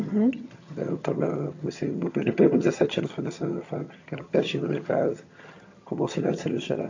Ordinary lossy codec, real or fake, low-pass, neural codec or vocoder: AAC, 48 kbps; fake; 7.2 kHz; vocoder, 22.05 kHz, 80 mel bands, HiFi-GAN